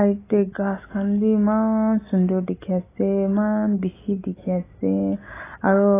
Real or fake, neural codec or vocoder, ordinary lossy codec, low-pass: real; none; AAC, 16 kbps; 3.6 kHz